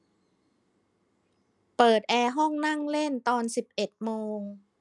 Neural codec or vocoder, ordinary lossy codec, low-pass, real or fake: none; none; 10.8 kHz; real